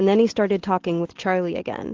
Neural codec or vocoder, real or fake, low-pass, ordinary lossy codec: none; real; 7.2 kHz; Opus, 16 kbps